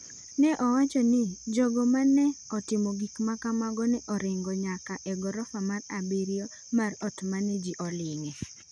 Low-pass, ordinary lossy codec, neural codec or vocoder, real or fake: 9.9 kHz; none; none; real